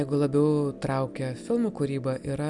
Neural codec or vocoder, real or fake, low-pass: none; real; 10.8 kHz